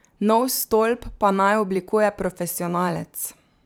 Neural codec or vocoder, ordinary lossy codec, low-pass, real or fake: vocoder, 44.1 kHz, 128 mel bands every 512 samples, BigVGAN v2; none; none; fake